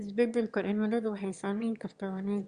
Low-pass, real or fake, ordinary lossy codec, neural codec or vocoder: 9.9 kHz; fake; Opus, 64 kbps; autoencoder, 22.05 kHz, a latent of 192 numbers a frame, VITS, trained on one speaker